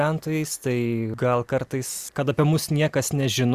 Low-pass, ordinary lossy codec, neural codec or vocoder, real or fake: 14.4 kHz; Opus, 64 kbps; none; real